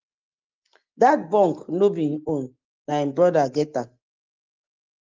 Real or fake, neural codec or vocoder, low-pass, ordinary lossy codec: real; none; 7.2 kHz; Opus, 32 kbps